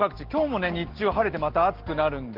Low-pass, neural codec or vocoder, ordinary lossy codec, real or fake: 5.4 kHz; none; Opus, 16 kbps; real